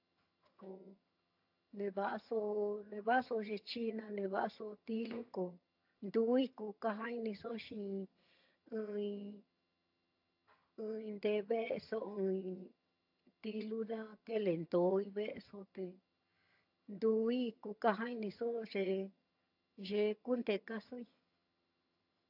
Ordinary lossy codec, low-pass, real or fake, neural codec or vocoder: AAC, 48 kbps; 5.4 kHz; fake; vocoder, 22.05 kHz, 80 mel bands, HiFi-GAN